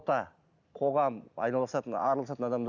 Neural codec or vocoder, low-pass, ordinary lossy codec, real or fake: none; 7.2 kHz; none; real